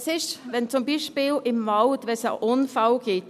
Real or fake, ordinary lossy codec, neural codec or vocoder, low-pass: real; none; none; 14.4 kHz